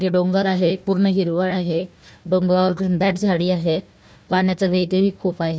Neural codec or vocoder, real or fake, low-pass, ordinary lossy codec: codec, 16 kHz, 1 kbps, FunCodec, trained on Chinese and English, 50 frames a second; fake; none; none